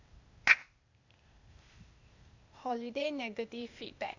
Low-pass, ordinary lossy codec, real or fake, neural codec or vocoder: 7.2 kHz; Opus, 64 kbps; fake; codec, 16 kHz, 0.8 kbps, ZipCodec